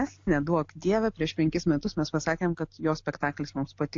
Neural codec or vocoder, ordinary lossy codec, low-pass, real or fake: none; AAC, 48 kbps; 7.2 kHz; real